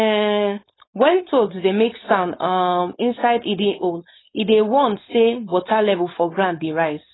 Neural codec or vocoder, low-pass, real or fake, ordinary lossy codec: codec, 16 kHz, 4.8 kbps, FACodec; 7.2 kHz; fake; AAC, 16 kbps